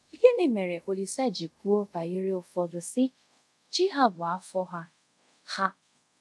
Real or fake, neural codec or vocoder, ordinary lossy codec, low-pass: fake; codec, 24 kHz, 0.5 kbps, DualCodec; none; none